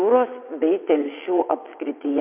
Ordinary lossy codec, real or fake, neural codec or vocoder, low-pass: MP3, 32 kbps; fake; vocoder, 22.05 kHz, 80 mel bands, WaveNeXt; 3.6 kHz